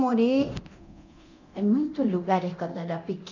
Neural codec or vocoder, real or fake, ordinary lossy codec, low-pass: codec, 24 kHz, 0.9 kbps, DualCodec; fake; none; 7.2 kHz